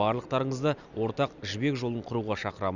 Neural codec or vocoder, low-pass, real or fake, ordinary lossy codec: none; 7.2 kHz; real; none